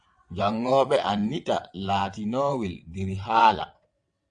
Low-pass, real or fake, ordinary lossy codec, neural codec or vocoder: 9.9 kHz; fake; AAC, 64 kbps; vocoder, 22.05 kHz, 80 mel bands, WaveNeXt